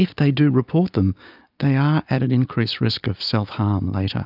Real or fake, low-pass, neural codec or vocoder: real; 5.4 kHz; none